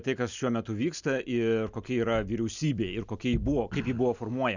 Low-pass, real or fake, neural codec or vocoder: 7.2 kHz; real; none